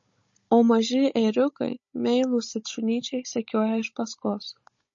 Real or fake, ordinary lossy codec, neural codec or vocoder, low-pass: fake; MP3, 32 kbps; codec, 16 kHz, 16 kbps, FunCodec, trained on Chinese and English, 50 frames a second; 7.2 kHz